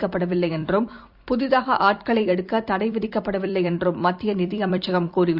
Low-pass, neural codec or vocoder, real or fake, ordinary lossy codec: 5.4 kHz; vocoder, 44.1 kHz, 128 mel bands every 256 samples, BigVGAN v2; fake; Opus, 64 kbps